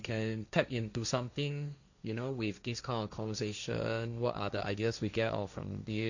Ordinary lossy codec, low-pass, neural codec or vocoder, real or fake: none; 7.2 kHz; codec, 16 kHz, 1.1 kbps, Voila-Tokenizer; fake